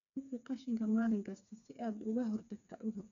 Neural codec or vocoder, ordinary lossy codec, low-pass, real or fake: codec, 16 kHz, 4 kbps, FreqCodec, smaller model; none; 7.2 kHz; fake